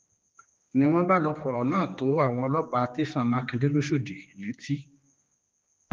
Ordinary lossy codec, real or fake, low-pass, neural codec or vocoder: Opus, 32 kbps; fake; 7.2 kHz; codec, 16 kHz, 2 kbps, X-Codec, HuBERT features, trained on general audio